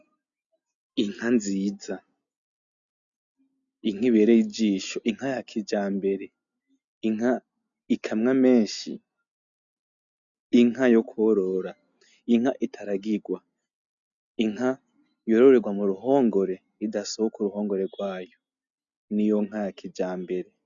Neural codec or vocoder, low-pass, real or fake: none; 7.2 kHz; real